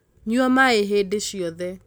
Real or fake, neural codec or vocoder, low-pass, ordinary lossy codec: real; none; none; none